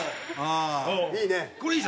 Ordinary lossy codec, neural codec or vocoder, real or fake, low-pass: none; none; real; none